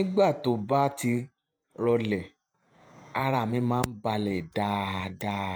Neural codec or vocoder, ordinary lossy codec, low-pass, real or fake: vocoder, 48 kHz, 128 mel bands, Vocos; none; none; fake